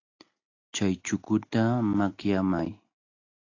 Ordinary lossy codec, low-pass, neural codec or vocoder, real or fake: AAC, 48 kbps; 7.2 kHz; none; real